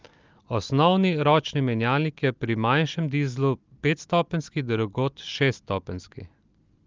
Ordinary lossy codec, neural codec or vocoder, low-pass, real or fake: Opus, 32 kbps; none; 7.2 kHz; real